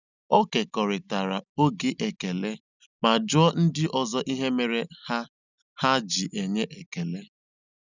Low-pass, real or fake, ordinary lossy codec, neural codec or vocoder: 7.2 kHz; real; none; none